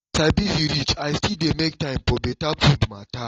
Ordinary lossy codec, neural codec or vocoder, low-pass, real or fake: AAC, 32 kbps; none; 7.2 kHz; real